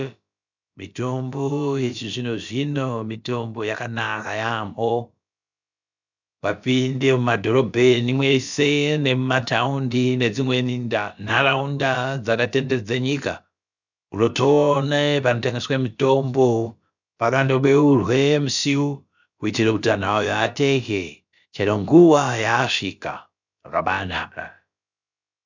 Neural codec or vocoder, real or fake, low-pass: codec, 16 kHz, about 1 kbps, DyCAST, with the encoder's durations; fake; 7.2 kHz